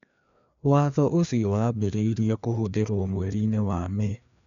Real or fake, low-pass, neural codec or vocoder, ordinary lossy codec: fake; 7.2 kHz; codec, 16 kHz, 2 kbps, FreqCodec, larger model; none